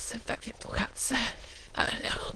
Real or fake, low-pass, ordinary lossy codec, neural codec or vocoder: fake; 9.9 kHz; Opus, 16 kbps; autoencoder, 22.05 kHz, a latent of 192 numbers a frame, VITS, trained on many speakers